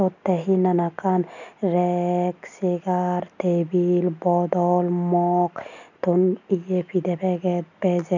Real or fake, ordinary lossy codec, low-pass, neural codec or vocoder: real; none; 7.2 kHz; none